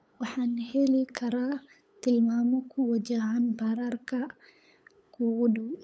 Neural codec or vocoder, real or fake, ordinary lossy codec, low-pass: codec, 16 kHz, 8 kbps, FunCodec, trained on LibriTTS, 25 frames a second; fake; none; none